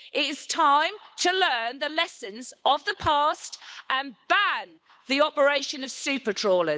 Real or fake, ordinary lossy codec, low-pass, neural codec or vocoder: fake; none; none; codec, 16 kHz, 8 kbps, FunCodec, trained on Chinese and English, 25 frames a second